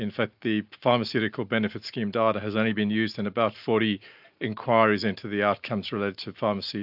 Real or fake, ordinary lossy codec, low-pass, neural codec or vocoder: real; AAC, 48 kbps; 5.4 kHz; none